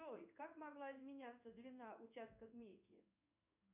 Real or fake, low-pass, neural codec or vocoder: fake; 3.6 kHz; codec, 16 kHz in and 24 kHz out, 1 kbps, XY-Tokenizer